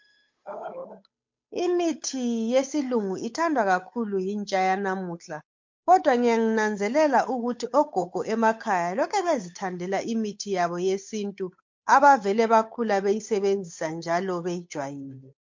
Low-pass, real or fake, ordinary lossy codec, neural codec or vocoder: 7.2 kHz; fake; MP3, 48 kbps; codec, 16 kHz, 8 kbps, FunCodec, trained on Chinese and English, 25 frames a second